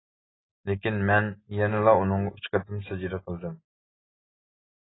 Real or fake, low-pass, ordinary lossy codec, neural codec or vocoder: real; 7.2 kHz; AAC, 16 kbps; none